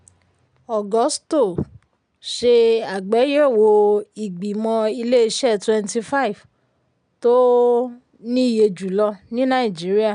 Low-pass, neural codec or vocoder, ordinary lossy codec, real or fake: 9.9 kHz; none; none; real